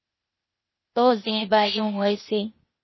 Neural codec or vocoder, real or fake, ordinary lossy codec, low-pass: codec, 16 kHz, 0.8 kbps, ZipCodec; fake; MP3, 24 kbps; 7.2 kHz